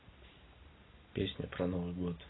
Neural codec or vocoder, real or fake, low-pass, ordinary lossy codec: none; real; 7.2 kHz; AAC, 16 kbps